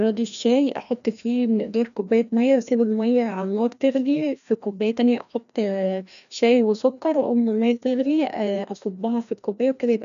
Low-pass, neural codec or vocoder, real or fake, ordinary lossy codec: 7.2 kHz; codec, 16 kHz, 1 kbps, FreqCodec, larger model; fake; none